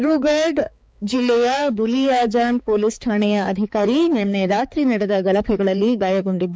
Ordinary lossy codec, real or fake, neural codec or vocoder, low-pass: none; fake; codec, 16 kHz, 4 kbps, X-Codec, HuBERT features, trained on balanced general audio; none